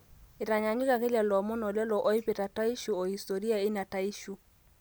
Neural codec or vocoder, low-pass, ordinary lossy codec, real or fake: none; none; none; real